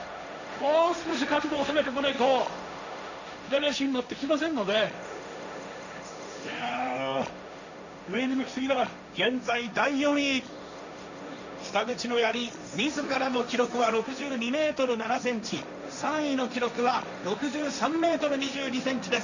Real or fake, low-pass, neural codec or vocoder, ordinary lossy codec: fake; 7.2 kHz; codec, 16 kHz, 1.1 kbps, Voila-Tokenizer; none